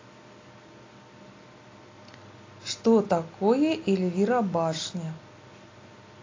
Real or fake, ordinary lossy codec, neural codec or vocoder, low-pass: real; AAC, 32 kbps; none; 7.2 kHz